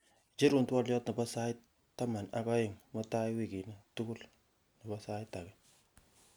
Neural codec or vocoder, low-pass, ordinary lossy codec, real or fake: none; none; none; real